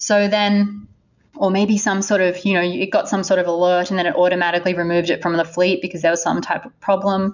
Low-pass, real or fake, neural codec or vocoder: 7.2 kHz; real; none